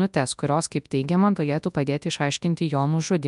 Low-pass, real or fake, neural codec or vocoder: 10.8 kHz; fake; codec, 24 kHz, 0.9 kbps, WavTokenizer, large speech release